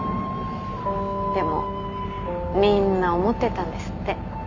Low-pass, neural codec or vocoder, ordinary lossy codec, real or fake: 7.2 kHz; none; none; real